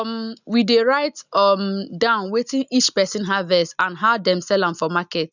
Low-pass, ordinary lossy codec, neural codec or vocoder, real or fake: 7.2 kHz; none; none; real